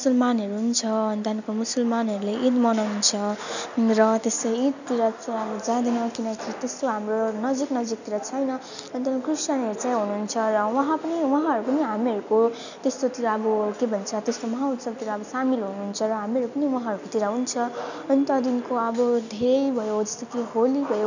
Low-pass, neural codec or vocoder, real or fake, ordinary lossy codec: 7.2 kHz; none; real; none